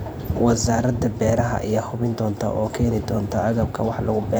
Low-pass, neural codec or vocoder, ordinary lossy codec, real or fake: none; vocoder, 44.1 kHz, 128 mel bands every 512 samples, BigVGAN v2; none; fake